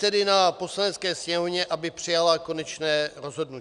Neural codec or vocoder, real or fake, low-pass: none; real; 10.8 kHz